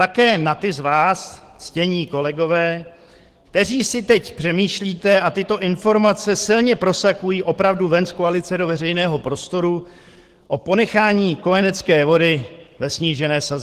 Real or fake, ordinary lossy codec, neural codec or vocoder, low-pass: fake; Opus, 16 kbps; codec, 44.1 kHz, 7.8 kbps, DAC; 14.4 kHz